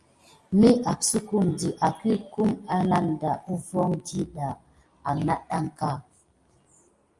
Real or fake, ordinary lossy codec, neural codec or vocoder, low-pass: real; Opus, 32 kbps; none; 10.8 kHz